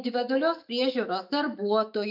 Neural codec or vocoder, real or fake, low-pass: autoencoder, 48 kHz, 128 numbers a frame, DAC-VAE, trained on Japanese speech; fake; 5.4 kHz